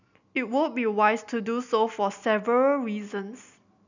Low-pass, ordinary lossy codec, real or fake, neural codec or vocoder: 7.2 kHz; none; real; none